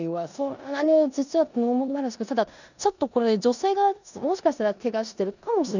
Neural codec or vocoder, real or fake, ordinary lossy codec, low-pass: codec, 16 kHz in and 24 kHz out, 0.9 kbps, LongCat-Audio-Codec, four codebook decoder; fake; none; 7.2 kHz